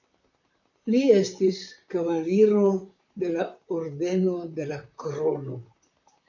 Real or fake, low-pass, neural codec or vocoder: fake; 7.2 kHz; vocoder, 44.1 kHz, 128 mel bands, Pupu-Vocoder